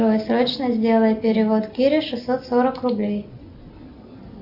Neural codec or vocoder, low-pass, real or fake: none; 5.4 kHz; real